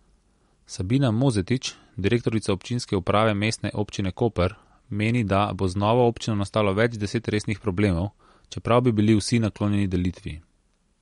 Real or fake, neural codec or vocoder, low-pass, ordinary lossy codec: real; none; 10.8 kHz; MP3, 48 kbps